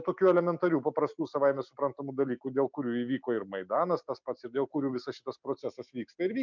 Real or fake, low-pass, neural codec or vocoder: real; 7.2 kHz; none